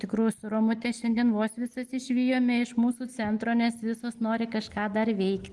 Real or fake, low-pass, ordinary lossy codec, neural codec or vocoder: real; 10.8 kHz; Opus, 24 kbps; none